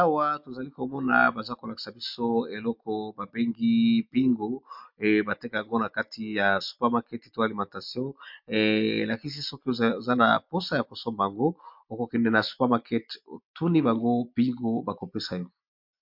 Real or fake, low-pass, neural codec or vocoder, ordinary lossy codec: real; 5.4 kHz; none; MP3, 48 kbps